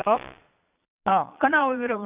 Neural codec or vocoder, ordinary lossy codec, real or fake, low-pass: vocoder, 22.05 kHz, 80 mel bands, Vocos; Opus, 64 kbps; fake; 3.6 kHz